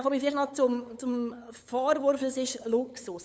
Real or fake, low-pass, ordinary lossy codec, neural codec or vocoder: fake; none; none; codec, 16 kHz, 8 kbps, FunCodec, trained on LibriTTS, 25 frames a second